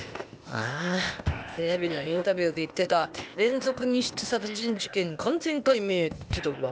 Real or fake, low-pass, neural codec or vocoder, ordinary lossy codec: fake; none; codec, 16 kHz, 0.8 kbps, ZipCodec; none